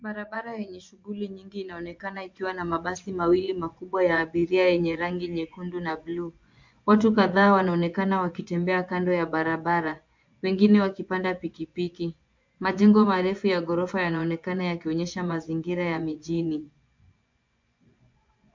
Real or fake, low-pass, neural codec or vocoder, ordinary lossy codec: fake; 7.2 kHz; vocoder, 24 kHz, 100 mel bands, Vocos; MP3, 48 kbps